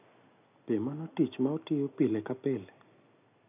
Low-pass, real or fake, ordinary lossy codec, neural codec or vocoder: 3.6 kHz; real; none; none